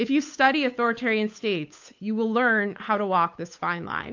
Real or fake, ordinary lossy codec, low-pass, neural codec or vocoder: fake; AAC, 48 kbps; 7.2 kHz; codec, 16 kHz, 8 kbps, FunCodec, trained on Chinese and English, 25 frames a second